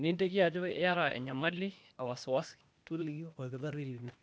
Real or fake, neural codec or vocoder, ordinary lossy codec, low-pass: fake; codec, 16 kHz, 0.8 kbps, ZipCodec; none; none